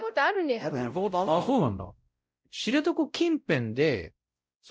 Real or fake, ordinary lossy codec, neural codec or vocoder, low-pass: fake; none; codec, 16 kHz, 0.5 kbps, X-Codec, WavLM features, trained on Multilingual LibriSpeech; none